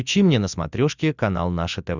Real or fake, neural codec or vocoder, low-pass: real; none; 7.2 kHz